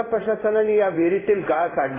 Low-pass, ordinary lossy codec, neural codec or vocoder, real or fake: 3.6 kHz; AAC, 16 kbps; codec, 16 kHz in and 24 kHz out, 1 kbps, XY-Tokenizer; fake